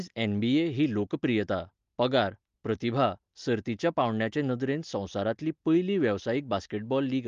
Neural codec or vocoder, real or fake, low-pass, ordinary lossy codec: none; real; 7.2 kHz; Opus, 32 kbps